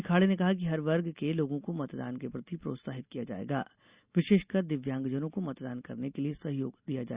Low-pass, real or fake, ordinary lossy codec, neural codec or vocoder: 3.6 kHz; real; Opus, 64 kbps; none